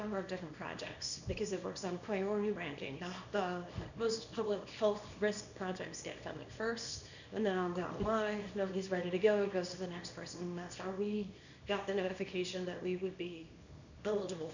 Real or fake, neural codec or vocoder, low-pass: fake; codec, 24 kHz, 0.9 kbps, WavTokenizer, small release; 7.2 kHz